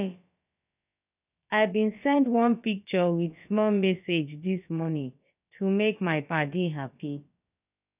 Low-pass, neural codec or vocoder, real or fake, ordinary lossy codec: 3.6 kHz; codec, 16 kHz, about 1 kbps, DyCAST, with the encoder's durations; fake; none